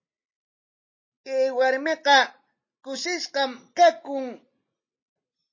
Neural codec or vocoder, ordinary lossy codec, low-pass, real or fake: none; MP3, 32 kbps; 7.2 kHz; real